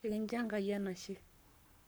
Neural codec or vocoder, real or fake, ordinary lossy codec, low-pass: codec, 44.1 kHz, 7.8 kbps, Pupu-Codec; fake; none; none